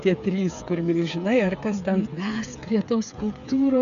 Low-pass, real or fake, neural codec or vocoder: 7.2 kHz; fake; codec, 16 kHz, 8 kbps, FreqCodec, smaller model